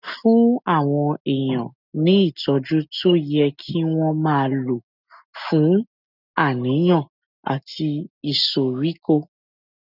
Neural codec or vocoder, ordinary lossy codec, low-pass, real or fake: none; none; 5.4 kHz; real